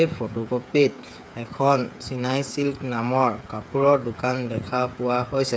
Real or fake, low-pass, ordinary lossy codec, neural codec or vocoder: fake; none; none; codec, 16 kHz, 8 kbps, FreqCodec, smaller model